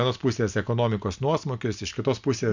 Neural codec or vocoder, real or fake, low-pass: none; real; 7.2 kHz